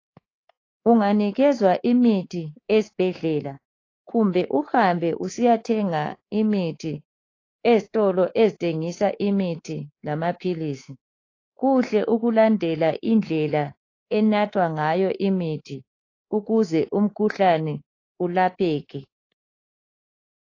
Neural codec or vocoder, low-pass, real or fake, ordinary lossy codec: codec, 24 kHz, 3.1 kbps, DualCodec; 7.2 kHz; fake; AAC, 32 kbps